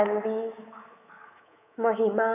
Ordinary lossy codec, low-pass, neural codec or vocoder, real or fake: none; 3.6 kHz; codec, 24 kHz, 3.1 kbps, DualCodec; fake